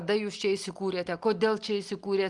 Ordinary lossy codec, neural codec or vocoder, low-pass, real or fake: Opus, 24 kbps; none; 10.8 kHz; real